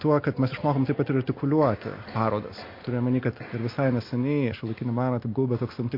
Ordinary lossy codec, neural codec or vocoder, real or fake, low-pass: MP3, 32 kbps; none; real; 5.4 kHz